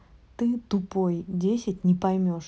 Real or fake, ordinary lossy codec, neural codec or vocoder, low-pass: real; none; none; none